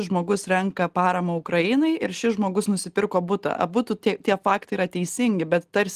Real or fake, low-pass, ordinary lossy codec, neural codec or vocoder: real; 14.4 kHz; Opus, 24 kbps; none